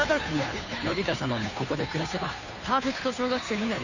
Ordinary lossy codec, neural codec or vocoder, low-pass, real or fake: none; codec, 16 kHz, 2 kbps, FunCodec, trained on Chinese and English, 25 frames a second; 7.2 kHz; fake